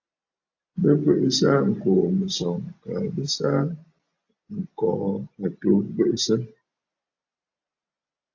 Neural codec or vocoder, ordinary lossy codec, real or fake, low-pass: none; AAC, 48 kbps; real; 7.2 kHz